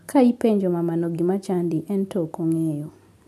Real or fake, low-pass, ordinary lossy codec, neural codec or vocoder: real; 14.4 kHz; none; none